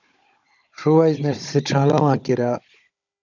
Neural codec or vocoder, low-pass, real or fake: codec, 16 kHz, 16 kbps, FunCodec, trained on Chinese and English, 50 frames a second; 7.2 kHz; fake